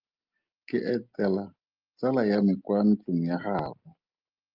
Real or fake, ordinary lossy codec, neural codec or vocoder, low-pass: real; Opus, 24 kbps; none; 5.4 kHz